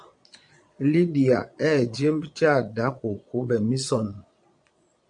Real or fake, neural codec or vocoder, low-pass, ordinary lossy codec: fake; vocoder, 22.05 kHz, 80 mel bands, Vocos; 9.9 kHz; AAC, 64 kbps